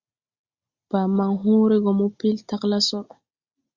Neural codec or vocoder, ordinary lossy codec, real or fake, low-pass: none; Opus, 64 kbps; real; 7.2 kHz